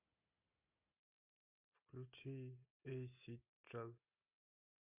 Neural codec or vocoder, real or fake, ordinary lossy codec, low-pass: none; real; MP3, 32 kbps; 3.6 kHz